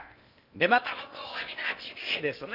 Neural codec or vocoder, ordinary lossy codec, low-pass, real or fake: codec, 16 kHz in and 24 kHz out, 0.8 kbps, FocalCodec, streaming, 65536 codes; none; 5.4 kHz; fake